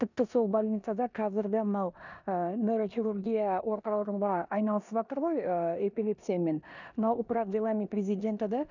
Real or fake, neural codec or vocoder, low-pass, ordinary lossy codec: fake; codec, 16 kHz in and 24 kHz out, 0.9 kbps, LongCat-Audio-Codec, four codebook decoder; 7.2 kHz; none